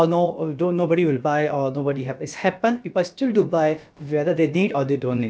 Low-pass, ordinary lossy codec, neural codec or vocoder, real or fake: none; none; codec, 16 kHz, about 1 kbps, DyCAST, with the encoder's durations; fake